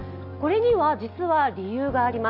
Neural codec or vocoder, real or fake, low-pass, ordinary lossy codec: none; real; 5.4 kHz; none